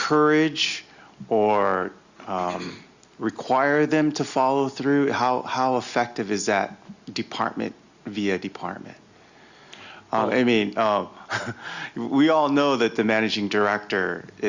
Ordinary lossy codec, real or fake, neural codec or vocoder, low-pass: Opus, 64 kbps; real; none; 7.2 kHz